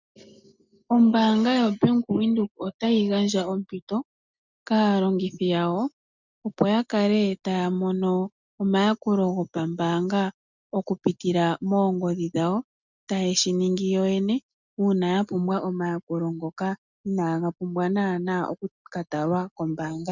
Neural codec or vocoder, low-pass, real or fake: none; 7.2 kHz; real